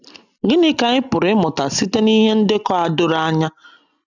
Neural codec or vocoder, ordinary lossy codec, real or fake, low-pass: none; none; real; 7.2 kHz